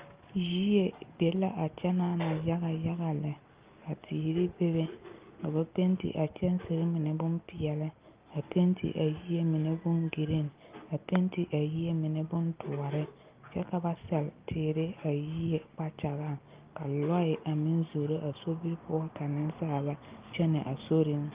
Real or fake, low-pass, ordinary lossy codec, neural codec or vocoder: real; 3.6 kHz; Opus, 24 kbps; none